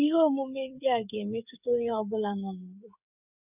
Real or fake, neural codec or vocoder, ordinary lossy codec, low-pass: fake; codec, 16 kHz, 8 kbps, FreqCodec, smaller model; none; 3.6 kHz